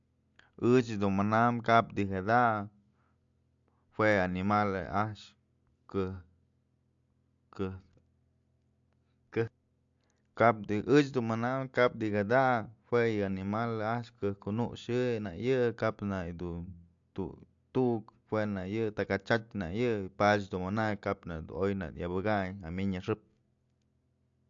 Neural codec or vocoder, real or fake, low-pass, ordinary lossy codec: none; real; 7.2 kHz; AAC, 64 kbps